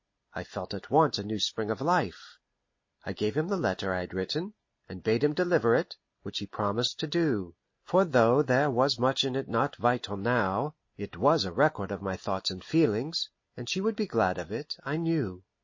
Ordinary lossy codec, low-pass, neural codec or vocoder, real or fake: MP3, 32 kbps; 7.2 kHz; none; real